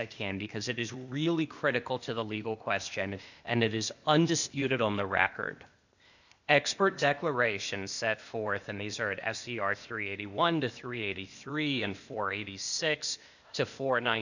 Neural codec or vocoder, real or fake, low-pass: codec, 16 kHz, 0.8 kbps, ZipCodec; fake; 7.2 kHz